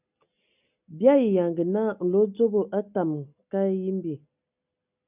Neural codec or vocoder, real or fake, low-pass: none; real; 3.6 kHz